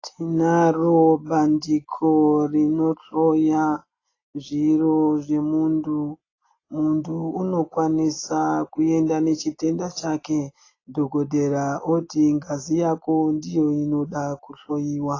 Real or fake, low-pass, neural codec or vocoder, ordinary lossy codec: real; 7.2 kHz; none; AAC, 32 kbps